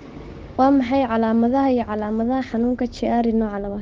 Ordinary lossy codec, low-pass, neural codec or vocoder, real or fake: Opus, 16 kbps; 7.2 kHz; codec, 16 kHz, 6 kbps, DAC; fake